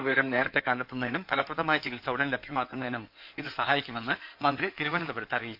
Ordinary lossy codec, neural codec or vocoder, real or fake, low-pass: none; codec, 16 kHz in and 24 kHz out, 2.2 kbps, FireRedTTS-2 codec; fake; 5.4 kHz